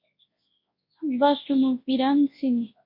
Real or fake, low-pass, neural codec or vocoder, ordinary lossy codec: fake; 5.4 kHz; codec, 24 kHz, 0.9 kbps, WavTokenizer, large speech release; MP3, 32 kbps